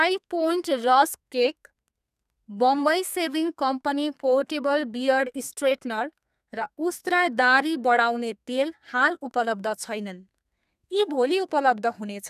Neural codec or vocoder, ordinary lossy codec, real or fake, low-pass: codec, 32 kHz, 1.9 kbps, SNAC; none; fake; 14.4 kHz